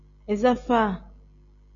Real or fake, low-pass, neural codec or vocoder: real; 7.2 kHz; none